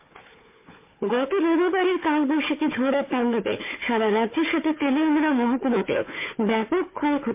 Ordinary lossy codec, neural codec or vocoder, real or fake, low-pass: MP3, 24 kbps; codec, 16 kHz, 4 kbps, FunCodec, trained on Chinese and English, 50 frames a second; fake; 3.6 kHz